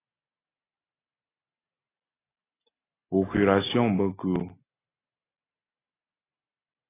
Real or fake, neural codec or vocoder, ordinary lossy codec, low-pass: real; none; AAC, 16 kbps; 3.6 kHz